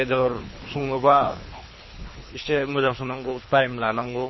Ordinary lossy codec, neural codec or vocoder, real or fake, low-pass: MP3, 24 kbps; codec, 24 kHz, 3 kbps, HILCodec; fake; 7.2 kHz